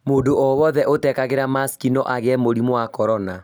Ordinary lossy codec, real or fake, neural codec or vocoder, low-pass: none; real; none; none